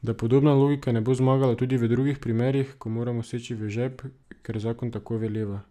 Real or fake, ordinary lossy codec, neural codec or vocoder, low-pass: real; none; none; 14.4 kHz